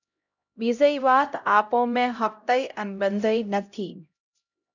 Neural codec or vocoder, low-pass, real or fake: codec, 16 kHz, 0.5 kbps, X-Codec, HuBERT features, trained on LibriSpeech; 7.2 kHz; fake